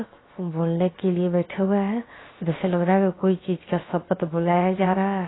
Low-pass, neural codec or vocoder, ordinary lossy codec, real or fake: 7.2 kHz; codec, 16 kHz, 0.7 kbps, FocalCodec; AAC, 16 kbps; fake